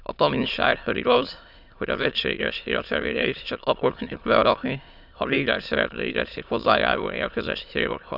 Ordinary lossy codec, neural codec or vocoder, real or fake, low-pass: none; autoencoder, 22.05 kHz, a latent of 192 numbers a frame, VITS, trained on many speakers; fake; 5.4 kHz